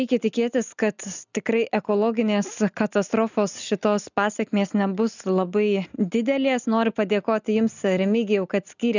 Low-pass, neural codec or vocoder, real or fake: 7.2 kHz; none; real